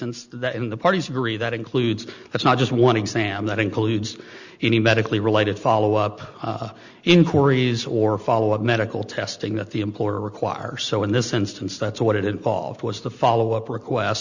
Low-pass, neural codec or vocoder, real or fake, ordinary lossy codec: 7.2 kHz; none; real; Opus, 64 kbps